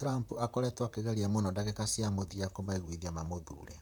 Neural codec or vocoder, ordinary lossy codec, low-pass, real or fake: vocoder, 44.1 kHz, 128 mel bands, Pupu-Vocoder; none; none; fake